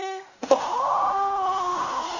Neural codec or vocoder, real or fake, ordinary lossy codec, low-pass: codec, 16 kHz in and 24 kHz out, 0.4 kbps, LongCat-Audio-Codec, fine tuned four codebook decoder; fake; none; 7.2 kHz